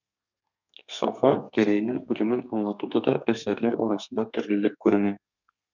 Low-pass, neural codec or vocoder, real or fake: 7.2 kHz; codec, 32 kHz, 1.9 kbps, SNAC; fake